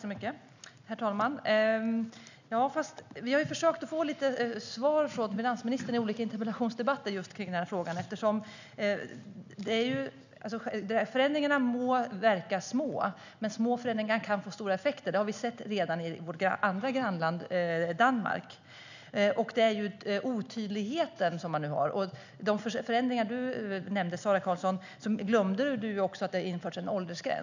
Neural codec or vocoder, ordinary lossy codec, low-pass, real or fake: none; none; 7.2 kHz; real